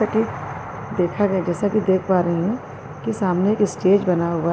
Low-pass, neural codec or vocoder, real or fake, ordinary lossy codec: none; none; real; none